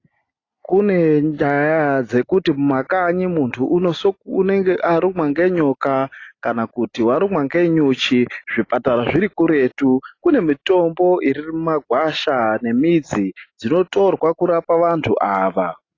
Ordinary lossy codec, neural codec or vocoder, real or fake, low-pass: AAC, 32 kbps; none; real; 7.2 kHz